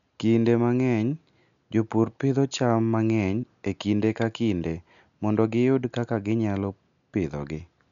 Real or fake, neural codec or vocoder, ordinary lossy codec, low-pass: real; none; none; 7.2 kHz